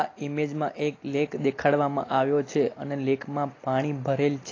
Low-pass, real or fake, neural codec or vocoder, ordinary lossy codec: 7.2 kHz; real; none; AAC, 32 kbps